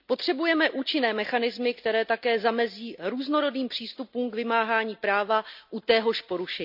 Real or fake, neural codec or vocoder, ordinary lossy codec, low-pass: real; none; none; 5.4 kHz